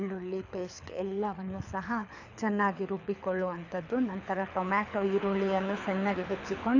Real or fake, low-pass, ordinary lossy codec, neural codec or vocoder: fake; 7.2 kHz; none; codec, 24 kHz, 6 kbps, HILCodec